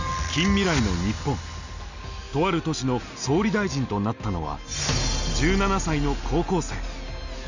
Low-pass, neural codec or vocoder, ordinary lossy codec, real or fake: 7.2 kHz; none; none; real